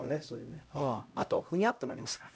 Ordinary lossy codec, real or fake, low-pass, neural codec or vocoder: none; fake; none; codec, 16 kHz, 0.5 kbps, X-Codec, HuBERT features, trained on LibriSpeech